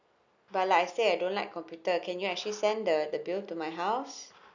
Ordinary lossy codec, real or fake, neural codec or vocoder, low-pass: none; real; none; 7.2 kHz